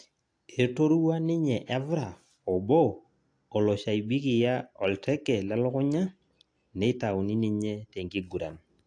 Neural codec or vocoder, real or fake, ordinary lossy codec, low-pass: none; real; AAC, 48 kbps; 9.9 kHz